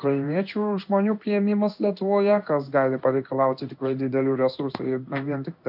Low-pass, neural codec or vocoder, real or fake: 5.4 kHz; codec, 16 kHz in and 24 kHz out, 1 kbps, XY-Tokenizer; fake